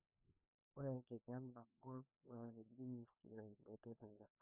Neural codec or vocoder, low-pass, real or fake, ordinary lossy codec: codec, 16 kHz, 2 kbps, FreqCodec, larger model; 3.6 kHz; fake; none